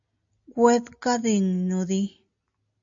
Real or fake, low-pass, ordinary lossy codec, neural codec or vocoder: real; 7.2 kHz; AAC, 64 kbps; none